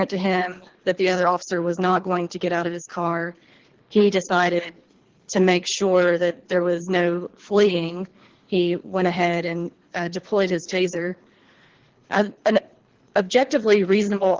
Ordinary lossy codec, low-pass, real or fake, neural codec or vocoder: Opus, 16 kbps; 7.2 kHz; fake; codec, 24 kHz, 3 kbps, HILCodec